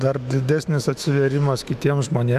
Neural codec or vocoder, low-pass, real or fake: autoencoder, 48 kHz, 128 numbers a frame, DAC-VAE, trained on Japanese speech; 14.4 kHz; fake